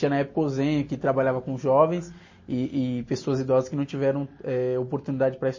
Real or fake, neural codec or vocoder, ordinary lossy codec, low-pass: real; none; MP3, 32 kbps; 7.2 kHz